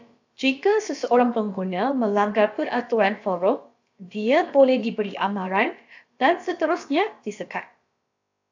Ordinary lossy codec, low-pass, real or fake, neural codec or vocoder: AAC, 48 kbps; 7.2 kHz; fake; codec, 16 kHz, about 1 kbps, DyCAST, with the encoder's durations